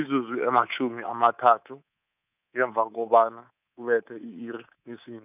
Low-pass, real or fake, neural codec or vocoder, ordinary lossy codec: 3.6 kHz; fake; codec, 24 kHz, 3.1 kbps, DualCodec; none